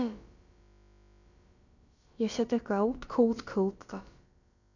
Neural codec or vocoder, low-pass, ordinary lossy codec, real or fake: codec, 16 kHz, about 1 kbps, DyCAST, with the encoder's durations; 7.2 kHz; none; fake